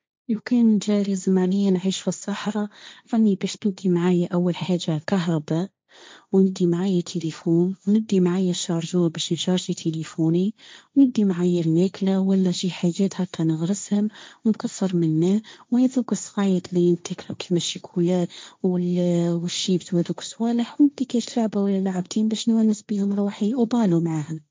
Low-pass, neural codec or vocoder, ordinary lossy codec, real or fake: none; codec, 16 kHz, 1.1 kbps, Voila-Tokenizer; none; fake